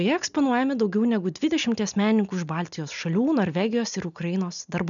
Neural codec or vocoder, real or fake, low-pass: none; real; 7.2 kHz